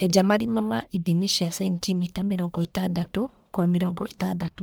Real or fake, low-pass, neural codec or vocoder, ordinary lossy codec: fake; none; codec, 44.1 kHz, 1.7 kbps, Pupu-Codec; none